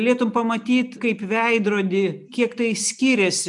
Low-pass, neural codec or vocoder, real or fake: 10.8 kHz; none; real